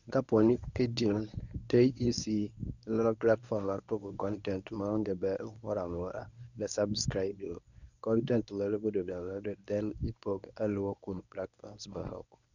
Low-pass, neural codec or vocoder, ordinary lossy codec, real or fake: 7.2 kHz; codec, 24 kHz, 0.9 kbps, WavTokenizer, medium speech release version 1; none; fake